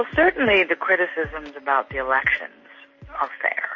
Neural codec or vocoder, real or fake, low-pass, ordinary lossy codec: none; real; 7.2 kHz; MP3, 32 kbps